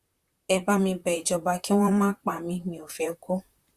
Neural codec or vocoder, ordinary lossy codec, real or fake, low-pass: vocoder, 44.1 kHz, 128 mel bands, Pupu-Vocoder; Opus, 64 kbps; fake; 14.4 kHz